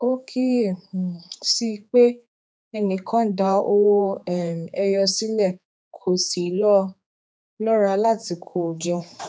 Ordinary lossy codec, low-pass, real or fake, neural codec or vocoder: none; none; fake; codec, 16 kHz, 4 kbps, X-Codec, HuBERT features, trained on general audio